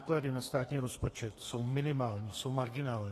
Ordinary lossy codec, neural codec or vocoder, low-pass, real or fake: AAC, 48 kbps; codec, 32 kHz, 1.9 kbps, SNAC; 14.4 kHz; fake